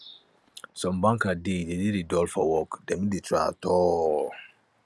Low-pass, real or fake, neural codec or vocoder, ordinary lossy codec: none; real; none; none